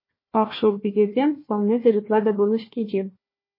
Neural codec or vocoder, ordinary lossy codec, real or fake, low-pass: codec, 16 kHz, 1 kbps, FunCodec, trained on Chinese and English, 50 frames a second; MP3, 24 kbps; fake; 5.4 kHz